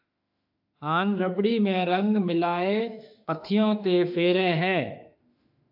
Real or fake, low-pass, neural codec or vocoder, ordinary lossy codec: fake; 5.4 kHz; autoencoder, 48 kHz, 32 numbers a frame, DAC-VAE, trained on Japanese speech; AAC, 48 kbps